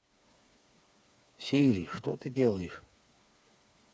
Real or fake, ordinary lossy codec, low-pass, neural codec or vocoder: fake; none; none; codec, 16 kHz, 4 kbps, FreqCodec, smaller model